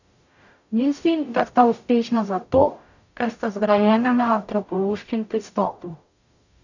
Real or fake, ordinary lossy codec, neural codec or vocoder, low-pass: fake; none; codec, 44.1 kHz, 0.9 kbps, DAC; 7.2 kHz